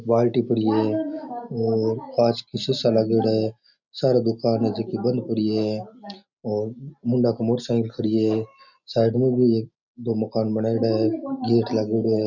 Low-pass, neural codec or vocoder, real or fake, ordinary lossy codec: 7.2 kHz; none; real; none